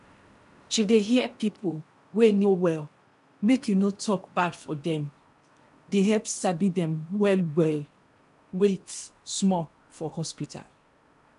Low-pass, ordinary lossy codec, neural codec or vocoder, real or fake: 10.8 kHz; none; codec, 16 kHz in and 24 kHz out, 0.8 kbps, FocalCodec, streaming, 65536 codes; fake